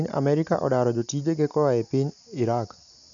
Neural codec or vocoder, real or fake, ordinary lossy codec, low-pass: none; real; none; 7.2 kHz